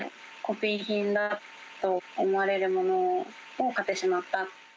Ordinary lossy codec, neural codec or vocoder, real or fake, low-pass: none; none; real; none